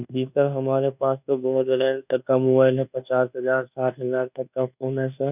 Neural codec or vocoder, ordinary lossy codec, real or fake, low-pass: codec, 24 kHz, 1.2 kbps, DualCodec; none; fake; 3.6 kHz